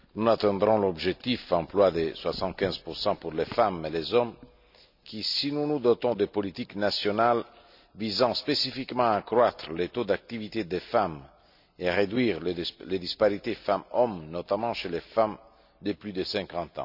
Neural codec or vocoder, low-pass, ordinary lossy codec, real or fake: none; 5.4 kHz; none; real